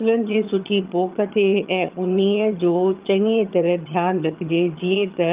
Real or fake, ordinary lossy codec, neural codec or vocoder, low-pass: fake; Opus, 32 kbps; vocoder, 22.05 kHz, 80 mel bands, HiFi-GAN; 3.6 kHz